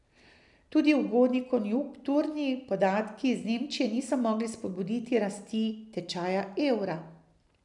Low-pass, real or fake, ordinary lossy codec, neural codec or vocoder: 10.8 kHz; real; none; none